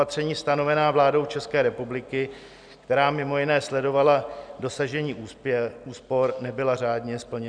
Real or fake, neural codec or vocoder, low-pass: real; none; 9.9 kHz